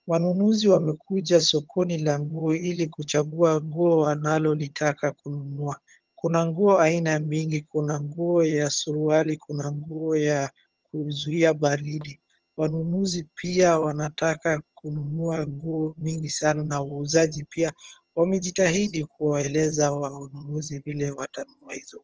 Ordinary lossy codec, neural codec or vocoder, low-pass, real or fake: Opus, 24 kbps; vocoder, 22.05 kHz, 80 mel bands, HiFi-GAN; 7.2 kHz; fake